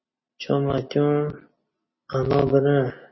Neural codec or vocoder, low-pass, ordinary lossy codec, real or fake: none; 7.2 kHz; MP3, 24 kbps; real